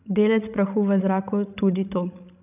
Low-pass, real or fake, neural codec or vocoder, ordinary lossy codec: 3.6 kHz; fake; codec, 16 kHz, 16 kbps, FreqCodec, larger model; none